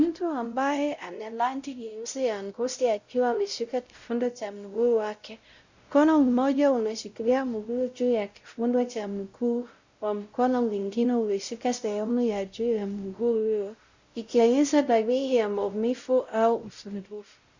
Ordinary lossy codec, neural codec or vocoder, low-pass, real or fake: Opus, 64 kbps; codec, 16 kHz, 0.5 kbps, X-Codec, WavLM features, trained on Multilingual LibriSpeech; 7.2 kHz; fake